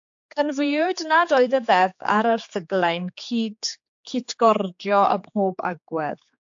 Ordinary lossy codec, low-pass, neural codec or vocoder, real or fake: AAC, 64 kbps; 7.2 kHz; codec, 16 kHz, 4 kbps, X-Codec, HuBERT features, trained on general audio; fake